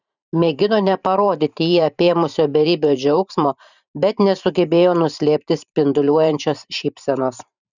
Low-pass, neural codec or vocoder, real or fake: 7.2 kHz; vocoder, 44.1 kHz, 128 mel bands every 512 samples, BigVGAN v2; fake